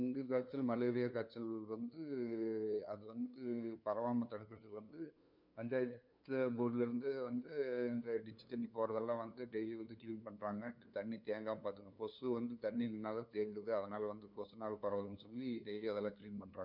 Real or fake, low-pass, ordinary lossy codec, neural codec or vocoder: fake; 5.4 kHz; none; codec, 16 kHz, 2 kbps, FunCodec, trained on LibriTTS, 25 frames a second